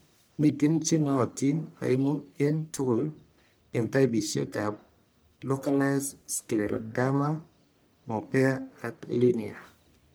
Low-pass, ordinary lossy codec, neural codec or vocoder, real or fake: none; none; codec, 44.1 kHz, 1.7 kbps, Pupu-Codec; fake